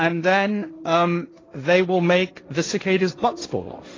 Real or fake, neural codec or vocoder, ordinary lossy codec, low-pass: fake; codec, 16 kHz, 1.1 kbps, Voila-Tokenizer; AAC, 32 kbps; 7.2 kHz